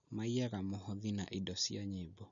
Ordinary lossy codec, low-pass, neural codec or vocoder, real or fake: none; 7.2 kHz; none; real